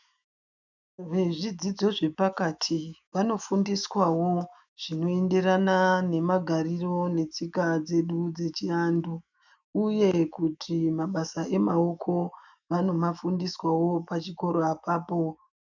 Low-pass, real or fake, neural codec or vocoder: 7.2 kHz; fake; autoencoder, 48 kHz, 128 numbers a frame, DAC-VAE, trained on Japanese speech